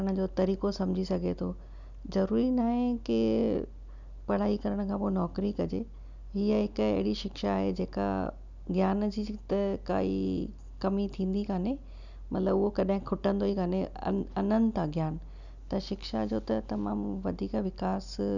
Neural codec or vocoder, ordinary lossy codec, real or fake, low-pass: none; none; real; 7.2 kHz